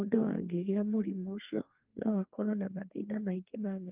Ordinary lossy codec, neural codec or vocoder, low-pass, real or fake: Opus, 32 kbps; codec, 32 kHz, 1.9 kbps, SNAC; 3.6 kHz; fake